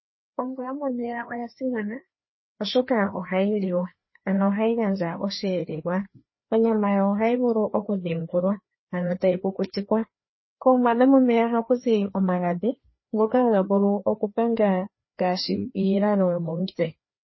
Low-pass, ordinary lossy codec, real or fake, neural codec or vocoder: 7.2 kHz; MP3, 24 kbps; fake; codec, 16 kHz, 2 kbps, FreqCodec, larger model